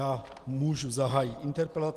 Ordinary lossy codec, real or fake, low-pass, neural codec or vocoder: Opus, 24 kbps; real; 14.4 kHz; none